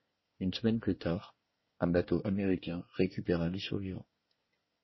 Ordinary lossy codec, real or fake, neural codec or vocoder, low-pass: MP3, 24 kbps; fake; codec, 24 kHz, 1 kbps, SNAC; 7.2 kHz